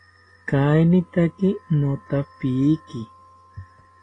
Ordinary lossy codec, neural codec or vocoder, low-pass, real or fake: AAC, 32 kbps; none; 9.9 kHz; real